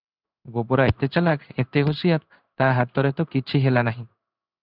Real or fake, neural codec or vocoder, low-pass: fake; codec, 16 kHz in and 24 kHz out, 1 kbps, XY-Tokenizer; 5.4 kHz